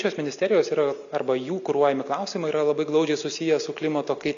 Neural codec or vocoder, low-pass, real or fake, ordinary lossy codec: none; 7.2 kHz; real; AAC, 64 kbps